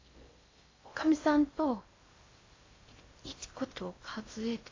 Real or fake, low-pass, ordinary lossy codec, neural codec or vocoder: fake; 7.2 kHz; AAC, 32 kbps; codec, 16 kHz in and 24 kHz out, 0.6 kbps, FocalCodec, streaming, 4096 codes